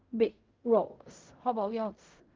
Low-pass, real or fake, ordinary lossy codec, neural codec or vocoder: 7.2 kHz; fake; Opus, 32 kbps; codec, 16 kHz in and 24 kHz out, 0.4 kbps, LongCat-Audio-Codec, fine tuned four codebook decoder